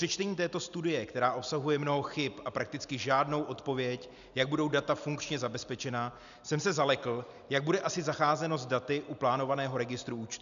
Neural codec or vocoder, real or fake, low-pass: none; real; 7.2 kHz